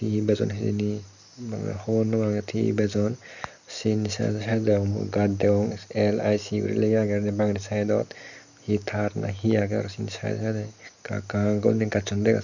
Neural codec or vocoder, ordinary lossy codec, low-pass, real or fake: none; none; 7.2 kHz; real